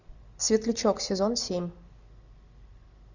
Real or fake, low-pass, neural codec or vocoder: real; 7.2 kHz; none